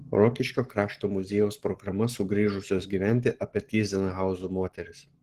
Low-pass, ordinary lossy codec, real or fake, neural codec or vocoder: 14.4 kHz; Opus, 16 kbps; fake; codec, 44.1 kHz, 7.8 kbps, Pupu-Codec